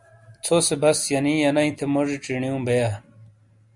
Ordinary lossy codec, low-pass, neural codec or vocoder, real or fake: Opus, 64 kbps; 10.8 kHz; none; real